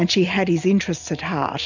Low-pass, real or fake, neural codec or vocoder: 7.2 kHz; real; none